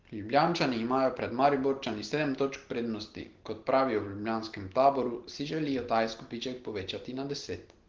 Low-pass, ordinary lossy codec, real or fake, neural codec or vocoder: 7.2 kHz; Opus, 16 kbps; real; none